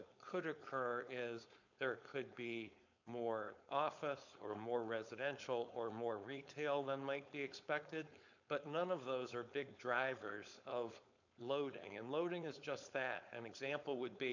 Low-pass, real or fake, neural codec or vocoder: 7.2 kHz; fake; codec, 16 kHz, 4.8 kbps, FACodec